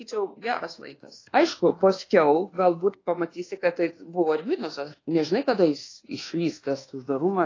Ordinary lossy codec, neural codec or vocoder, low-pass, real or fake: AAC, 32 kbps; codec, 24 kHz, 1.2 kbps, DualCodec; 7.2 kHz; fake